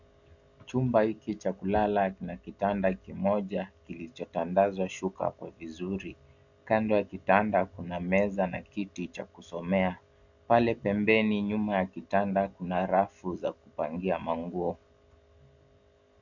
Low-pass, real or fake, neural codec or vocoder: 7.2 kHz; real; none